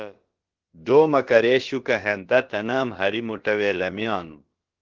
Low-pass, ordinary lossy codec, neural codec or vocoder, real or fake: 7.2 kHz; Opus, 16 kbps; codec, 16 kHz, about 1 kbps, DyCAST, with the encoder's durations; fake